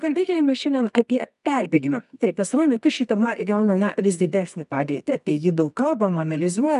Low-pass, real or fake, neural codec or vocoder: 10.8 kHz; fake; codec, 24 kHz, 0.9 kbps, WavTokenizer, medium music audio release